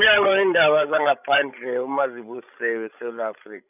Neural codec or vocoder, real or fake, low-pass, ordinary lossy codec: codec, 16 kHz, 16 kbps, FreqCodec, larger model; fake; 3.6 kHz; none